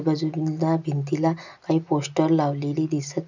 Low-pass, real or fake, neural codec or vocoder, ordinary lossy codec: 7.2 kHz; real; none; none